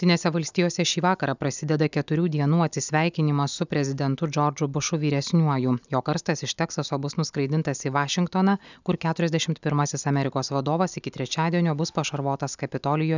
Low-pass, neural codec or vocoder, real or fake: 7.2 kHz; none; real